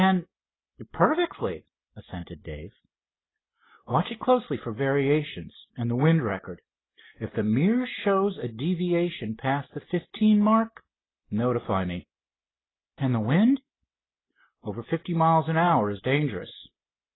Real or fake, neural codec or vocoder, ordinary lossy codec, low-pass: real; none; AAC, 16 kbps; 7.2 kHz